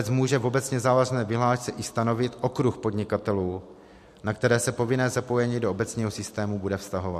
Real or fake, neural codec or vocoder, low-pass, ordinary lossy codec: real; none; 14.4 kHz; MP3, 64 kbps